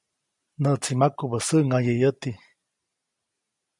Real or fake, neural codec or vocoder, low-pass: real; none; 10.8 kHz